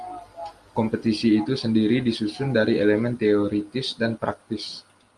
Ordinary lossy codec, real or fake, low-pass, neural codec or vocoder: Opus, 32 kbps; real; 10.8 kHz; none